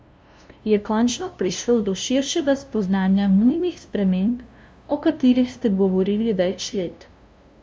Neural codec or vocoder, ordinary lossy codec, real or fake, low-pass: codec, 16 kHz, 0.5 kbps, FunCodec, trained on LibriTTS, 25 frames a second; none; fake; none